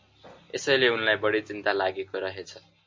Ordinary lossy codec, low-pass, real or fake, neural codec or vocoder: MP3, 64 kbps; 7.2 kHz; real; none